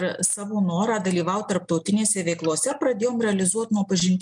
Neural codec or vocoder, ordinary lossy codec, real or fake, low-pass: none; MP3, 96 kbps; real; 10.8 kHz